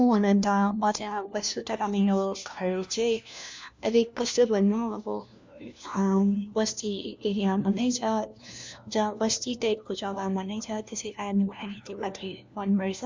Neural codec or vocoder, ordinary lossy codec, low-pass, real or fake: codec, 16 kHz, 1 kbps, FunCodec, trained on LibriTTS, 50 frames a second; MP3, 64 kbps; 7.2 kHz; fake